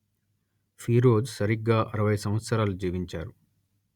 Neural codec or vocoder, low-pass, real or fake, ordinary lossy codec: none; 19.8 kHz; real; Opus, 64 kbps